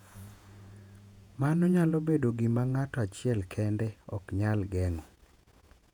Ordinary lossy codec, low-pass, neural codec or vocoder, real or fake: none; 19.8 kHz; vocoder, 48 kHz, 128 mel bands, Vocos; fake